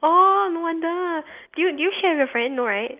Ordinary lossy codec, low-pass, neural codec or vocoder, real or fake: Opus, 24 kbps; 3.6 kHz; none; real